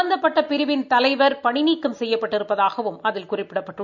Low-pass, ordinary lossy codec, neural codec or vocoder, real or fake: 7.2 kHz; none; none; real